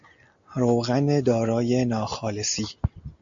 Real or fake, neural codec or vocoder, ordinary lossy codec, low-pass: real; none; AAC, 64 kbps; 7.2 kHz